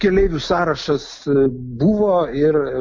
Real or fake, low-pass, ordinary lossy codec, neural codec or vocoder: real; 7.2 kHz; MP3, 48 kbps; none